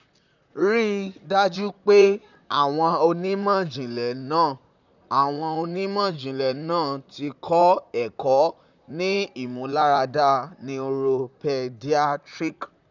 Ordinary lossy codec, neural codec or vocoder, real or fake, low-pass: none; vocoder, 44.1 kHz, 128 mel bands, Pupu-Vocoder; fake; 7.2 kHz